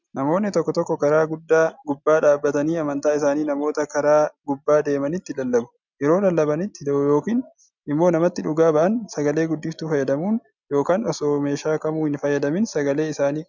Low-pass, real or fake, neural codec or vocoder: 7.2 kHz; real; none